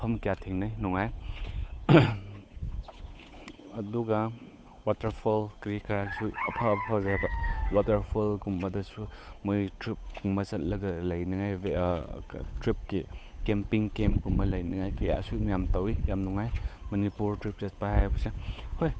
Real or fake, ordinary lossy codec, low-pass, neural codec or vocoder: fake; none; none; codec, 16 kHz, 8 kbps, FunCodec, trained on Chinese and English, 25 frames a second